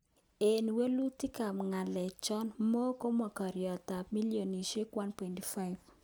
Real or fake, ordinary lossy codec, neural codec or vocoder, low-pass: real; none; none; none